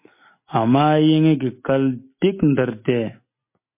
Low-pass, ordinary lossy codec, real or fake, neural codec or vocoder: 3.6 kHz; MP3, 24 kbps; real; none